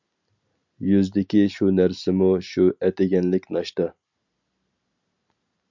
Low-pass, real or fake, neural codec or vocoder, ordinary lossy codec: 7.2 kHz; real; none; MP3, 64 kbps